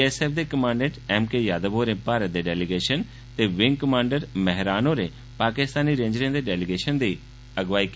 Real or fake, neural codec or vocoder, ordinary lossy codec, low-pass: real; none; none; none